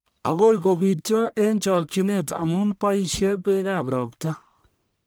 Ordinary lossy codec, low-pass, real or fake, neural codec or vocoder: none; none; fake; codec, 44.1 kHz, 1.7 kbps, Pupu-Codec